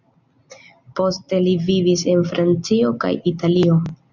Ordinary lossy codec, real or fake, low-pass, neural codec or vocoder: MP3, 64 kbps; real; 7.2 kHz; none